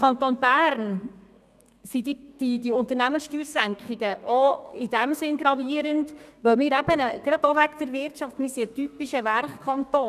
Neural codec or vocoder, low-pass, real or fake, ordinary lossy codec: codec, 32 kHz, 1.9 kbps, SNAC; 14.4 kHz; fake; none